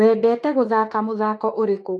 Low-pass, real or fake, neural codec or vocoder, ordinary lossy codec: 10.8 kHz; fake; autoencoder, 48 kHz, 32 numbers a frame, DAC-VAE, trained on Japanese speech; AAC, 48 kbps